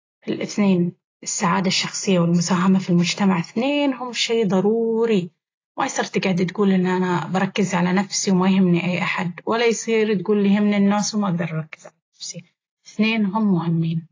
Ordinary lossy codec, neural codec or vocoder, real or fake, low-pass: AAC, 32 kbps; none; real; 7.2 kHz